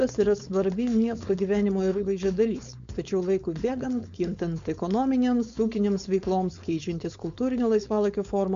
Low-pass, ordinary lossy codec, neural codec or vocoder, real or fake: 7.2 kHz; MP3, 64 kbps; codec, 16 kHz, 4.8 kbps, FACodec; fake